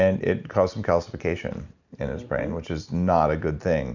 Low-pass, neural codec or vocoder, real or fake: 7.2 kHz; none; real